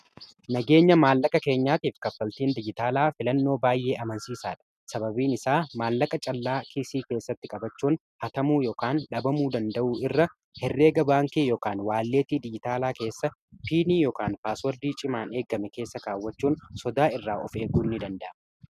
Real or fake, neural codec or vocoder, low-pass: real; none; 14.4 kHz